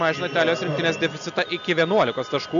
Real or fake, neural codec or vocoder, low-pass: real; none; 7.2 kHz